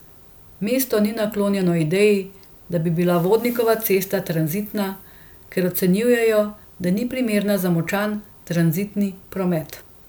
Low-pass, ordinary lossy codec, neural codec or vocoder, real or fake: none; none; none; real